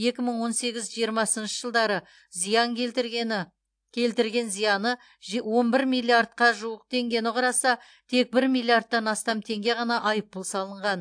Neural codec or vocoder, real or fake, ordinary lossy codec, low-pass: none; real; AAC, 64 kbps; 9.9 kHz